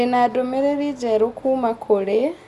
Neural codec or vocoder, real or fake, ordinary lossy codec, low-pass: none; real; Opus, 64 kbps; 14.4 kHz